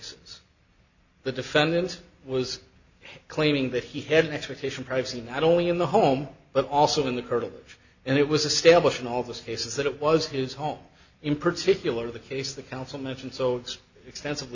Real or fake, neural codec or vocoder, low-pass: real; none; 7.2 kHz